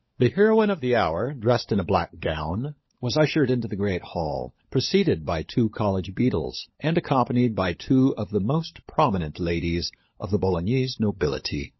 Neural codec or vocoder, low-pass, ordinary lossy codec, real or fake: codec, 16 kHz, 16 kbps, FunCodec, trained on LibriTTS, 50 frames a second; 7.2 kHz; MP3, 24 kbps; fake